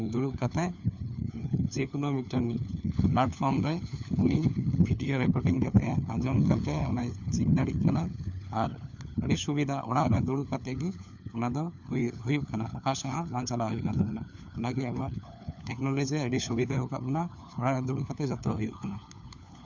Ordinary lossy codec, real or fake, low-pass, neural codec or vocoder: none; fake; 7.2 kHz; codec, 16 kHz, 4 kbps, FunCodec, trained on LibriTTS, 50 frames a second